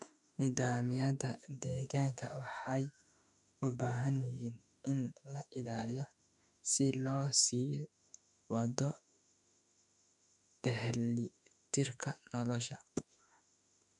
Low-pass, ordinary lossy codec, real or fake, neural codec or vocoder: 10.8 kHz; none; fake; autoencoder, 48 kHz, 32 numbers a frame, DAC-VAE, trained on Japanese speech